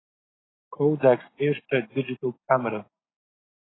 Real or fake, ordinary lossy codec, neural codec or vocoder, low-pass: real; AAC, 16 kbps; none; 7.2 kHz